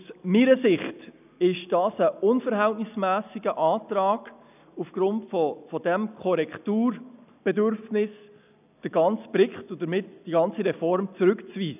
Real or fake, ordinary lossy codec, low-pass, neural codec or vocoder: real; none; 3.6 kHz; none